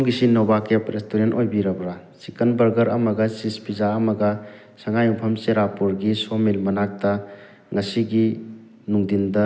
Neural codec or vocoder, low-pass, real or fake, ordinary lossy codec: none; none; real; none